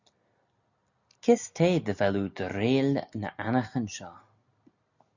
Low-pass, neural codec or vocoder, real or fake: 7.2 kHz; none; real